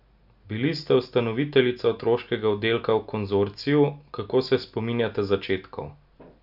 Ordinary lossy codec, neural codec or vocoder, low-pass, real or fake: none; none; 5.4 kHz; real